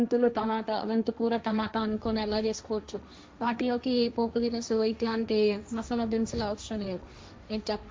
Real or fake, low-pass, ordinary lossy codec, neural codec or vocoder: fake; none; none; codec, 16 kHz, 1.1 kbps, Voila-Tokenizer